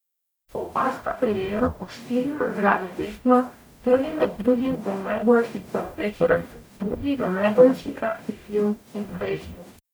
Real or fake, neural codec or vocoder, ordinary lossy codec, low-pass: fake; codec, 44.1 kHz, 0.9 kbps, DAC; none; none